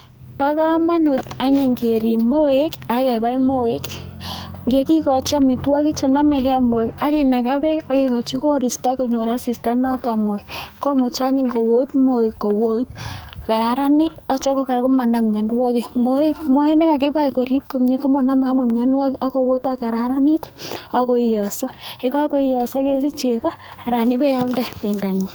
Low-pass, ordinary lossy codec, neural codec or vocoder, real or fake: none; none; codec, 44.1 kHz, 2.6 kbps, SNAC; fake